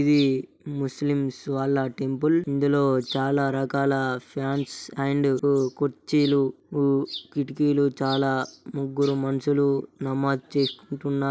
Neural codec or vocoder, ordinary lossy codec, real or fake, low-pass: none; none; real; none